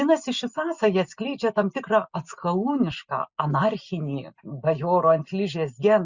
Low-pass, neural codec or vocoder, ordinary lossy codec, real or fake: 7.2 kHz; none; Opus, 64 kbps; real